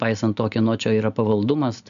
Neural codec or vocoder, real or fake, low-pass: none; real; 7.2 kHz